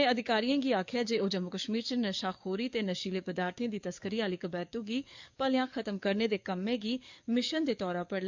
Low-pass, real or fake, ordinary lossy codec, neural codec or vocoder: 7.2 kHz; fake; MP3, 64 kbps; codec, 44.1 kHz, 7.8 kbps, DAC